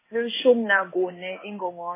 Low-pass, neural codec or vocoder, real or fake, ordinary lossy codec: 3.6 kHz; codec, 16 kHz in and 24 kHz out, 1 kbps, XY-Tokenizer; fake; MP3, 16 kbps